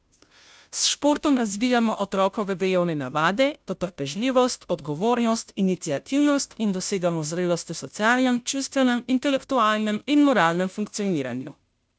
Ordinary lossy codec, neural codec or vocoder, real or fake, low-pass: none; codec, 16 kHz, 0.5 kbps, FunCodec, trained on Chinese and English, 25 frames a second; fake; none